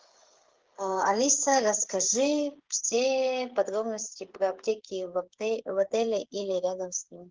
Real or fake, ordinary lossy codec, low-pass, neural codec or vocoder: fake; Opus, 16 kbps; 7.2 kHz; codec, 16 kHz, 8 kbps, FreqCodec, smaller model